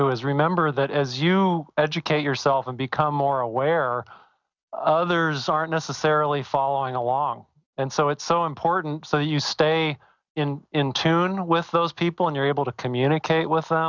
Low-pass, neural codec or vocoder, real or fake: 7.2 kHz; none; real